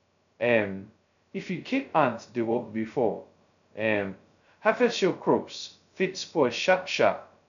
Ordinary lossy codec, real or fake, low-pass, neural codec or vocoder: none; fake; 7.2 kHz; codec, 16 kHz, 0.2 kbps, FocalCodec